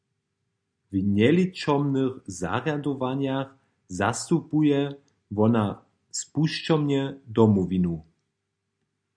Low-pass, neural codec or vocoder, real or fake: 9.9 kHz; none; real